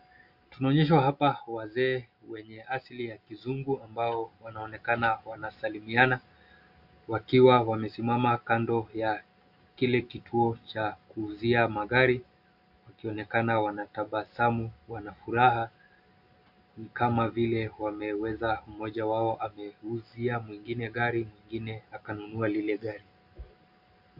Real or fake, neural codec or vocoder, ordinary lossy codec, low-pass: real; none; MP3, 48 kbps; 5.4 kHz